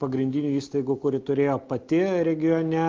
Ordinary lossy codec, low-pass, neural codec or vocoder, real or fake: Opus, 24 kbps; 7.2 kHz; none; real